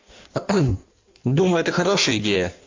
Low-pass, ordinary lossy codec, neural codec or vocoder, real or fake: 7.2 kHz; MP3, 48 kbps; codec, 16 kHz in and 24 kHz out, 1.1 kbps, FireRedTTS-2 codec; fake